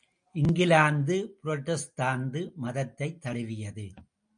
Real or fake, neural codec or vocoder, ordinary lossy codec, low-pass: real; none; MP3, 64 kbps; 9.9 kHz